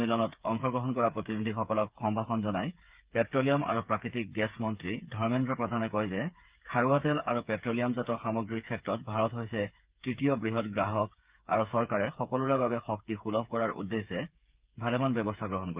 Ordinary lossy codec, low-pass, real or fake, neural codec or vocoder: Opus, 24 kbps; 3.6 kHz; fake; codec, 16 kHz, 8 kbps, FreqCodec, smaller model